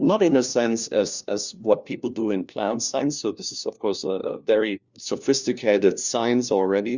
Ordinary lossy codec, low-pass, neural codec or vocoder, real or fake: Opus, 64 kbps; 7.2 kHz; codec, 16 kHz, 1 kbps, FunCodec, trained on LibriTTS, 50 frames a second; fake